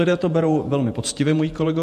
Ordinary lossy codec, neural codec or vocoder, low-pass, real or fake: MP3, 64 kbps; vocoder, 48 kHz, 128 mel bands, Vocos; 14.4 kHz; fake